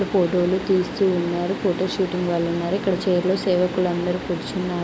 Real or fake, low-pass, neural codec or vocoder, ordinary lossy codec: real; none; none; none